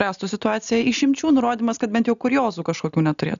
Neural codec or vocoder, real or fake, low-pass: none; real; 7.2 kHz